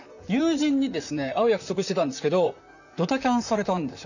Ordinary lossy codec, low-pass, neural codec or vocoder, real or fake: none; 7.2 kHz; vocoder, 44.1 kHz, 128 mel bands, Pupu-Vocoder; fake